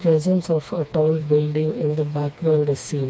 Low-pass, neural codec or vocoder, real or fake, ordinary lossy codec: none; codec, 16 kHz, 2 kbps, FreqCodec, smaller model; fake; none